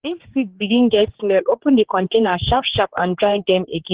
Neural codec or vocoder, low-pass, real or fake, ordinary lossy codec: codec, 16 kHz, 4 kbps, X-Codec, HuBERT features, trained on general audio; 3.6 kHz; fake; Opus, 16 kbps